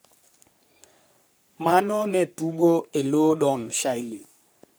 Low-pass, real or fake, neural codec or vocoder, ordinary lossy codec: none; fake; codec, 44.1 kHz, 3.4 kbps, Pupu-Codec; none